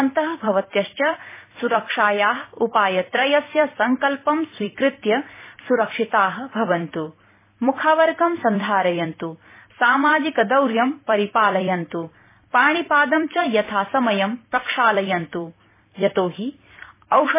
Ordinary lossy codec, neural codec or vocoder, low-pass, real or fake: MP3, 16 kbps; vocoder, 44.1 kHz, 128 mel bands every 256 samples, BigVGAN v2; 3.6 kHz; fake